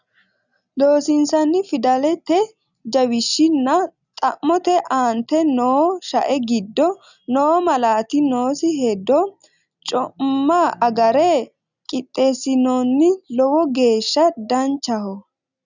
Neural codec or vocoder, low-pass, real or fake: none; 7.2 kHz; real